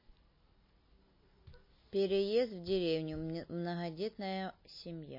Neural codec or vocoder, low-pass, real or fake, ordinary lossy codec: none; 5.4 kHz; real; MP3, 24 kbps